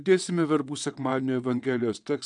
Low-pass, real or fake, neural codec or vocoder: 9.9 kHz; fake; vocoder, 22.05 kHz, 80 mel bands, WaveNeXt